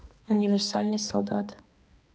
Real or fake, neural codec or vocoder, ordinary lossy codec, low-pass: fake; codec, 16 kHz, 2 kbps, X-Codec, HuBERT features, trained on balanced general audio; none; none